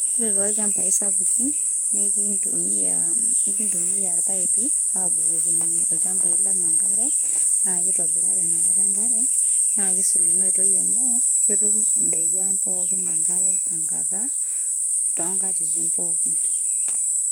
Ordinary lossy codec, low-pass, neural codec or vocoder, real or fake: none; none; codec, 44.1 kHz, 7.8 kbps, DAC; fake